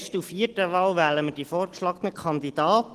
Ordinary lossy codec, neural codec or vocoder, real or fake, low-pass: Opus, 16 kbps; none; real; 14.4 kHz